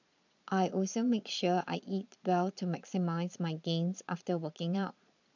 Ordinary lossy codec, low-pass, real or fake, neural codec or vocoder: none; 7.2 kHz; fake; vocoder, 22.05 kHz, 80 mel bands, Vocos